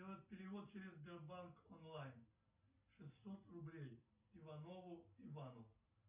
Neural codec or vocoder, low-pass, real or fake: none; 3.6 kHz; real